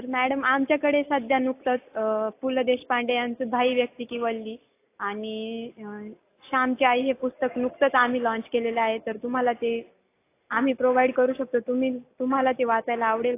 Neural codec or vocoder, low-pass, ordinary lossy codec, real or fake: none; 3.6 kHz; AAC, 24 kbps; real